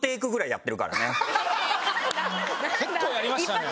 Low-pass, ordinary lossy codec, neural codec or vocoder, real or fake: none; none; none; real